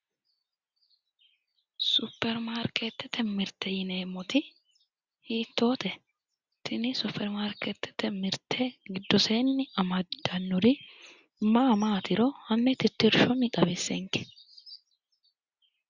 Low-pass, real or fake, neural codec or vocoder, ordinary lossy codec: 7.2 kHz; real; none; Opus, 64 kbps